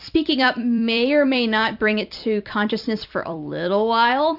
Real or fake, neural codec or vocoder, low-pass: fake; vocoder, 44.1 kHz, 128 mel bands every 256 samples, BigVGAN v2; 5.4 kHz